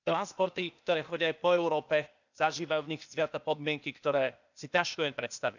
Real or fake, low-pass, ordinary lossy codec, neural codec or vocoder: fake; 7.2 kHz; none; codec, 16 kHz, 0.8 kbps, ZipCodec